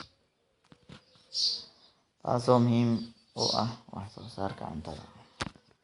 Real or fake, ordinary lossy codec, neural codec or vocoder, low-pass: real; Opus, 64 kbps; none; 10.8 kHz